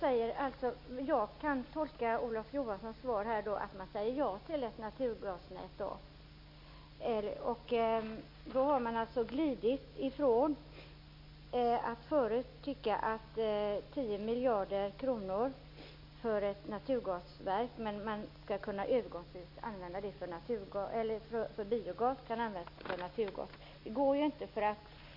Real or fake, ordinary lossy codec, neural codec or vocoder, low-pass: real; MP3, 32 kbps; none; 5.4 kHz